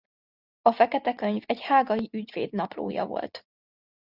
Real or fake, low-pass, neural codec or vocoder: real; 5.4 kHz; none